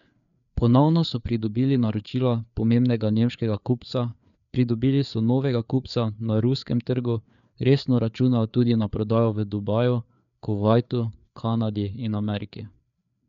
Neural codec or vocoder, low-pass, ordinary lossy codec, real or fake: codec, 16 kHz, 4 kbps, FreqCodec, larger model; 7.2 kHz; none; fake